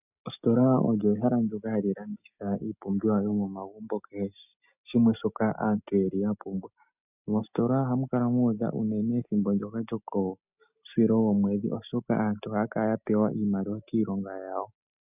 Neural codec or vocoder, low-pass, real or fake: none; 3.6 kHz; real